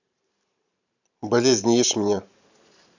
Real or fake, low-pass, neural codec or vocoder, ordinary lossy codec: fake; 7.2 kHz; vocoder, 44.1 kHz, 128 mel bands every 512 samples, BigVGAN v2; none